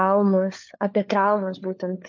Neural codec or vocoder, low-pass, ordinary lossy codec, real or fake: codec, 16 kHz, 4 kbps, FunCodec, trained on LibriTTS, 50 frames a second; 7.2 kHz; MP3, 48 kbps; fake